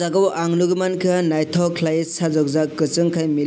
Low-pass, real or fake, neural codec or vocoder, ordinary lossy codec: none; real; none; none